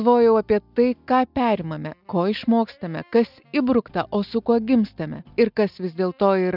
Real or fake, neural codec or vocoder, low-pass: real; none; 5.4 kHz